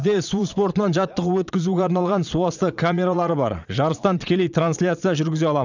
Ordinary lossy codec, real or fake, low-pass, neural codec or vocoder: none; fake; 7.2 kHz; vocoder, 22.05 kHz, 80 mel bands, Vocos